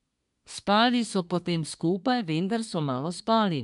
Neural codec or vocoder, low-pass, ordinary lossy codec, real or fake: codec, 24 kHz, 1 kbps, SNAC; 10.8 kHz; none; fake